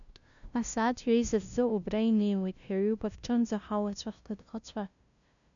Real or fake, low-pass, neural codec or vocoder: fake; 7.2 kHz; codec, 16 kHz, 0.5 kbps, FunCodec, trained on LibriTTS, 25 frames a second